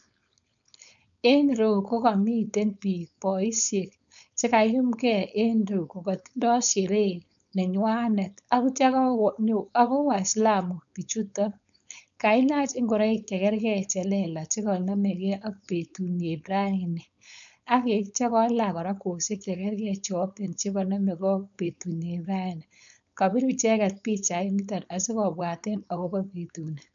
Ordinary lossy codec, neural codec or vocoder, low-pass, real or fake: none; codec, 16 kHz, 4.8 kbps, FACodec; 7.2 kHz; fake